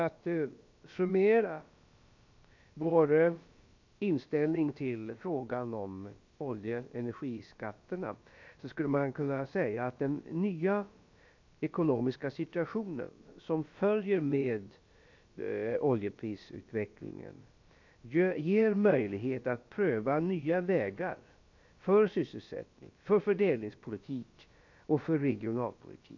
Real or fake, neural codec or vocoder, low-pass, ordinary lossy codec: fake; codec, 16 kHz, about 1 kbps, DyCAST, with the encoder's durations; 7.2 kHz; MP3, 48 kbps